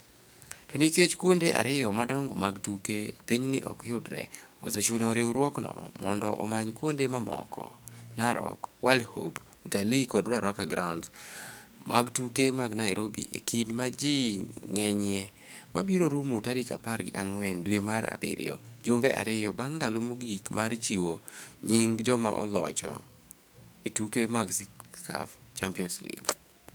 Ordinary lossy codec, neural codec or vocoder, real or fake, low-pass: none; codec, 44.1 kHz, 2.6 kbps, SNAC; fake; none